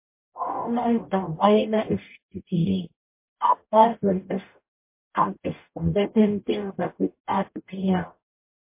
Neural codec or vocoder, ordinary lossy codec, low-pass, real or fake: codec, 44.1 kHz, 0.9 kbps, DAC; MP3, 24 kbps; 3.6 kHz; fake